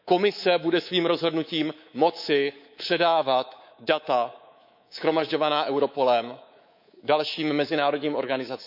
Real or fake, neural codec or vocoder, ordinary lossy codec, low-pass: fake; codec, 24 kHz, 3.1 kbps, DualCodec; none; 5.4 kHz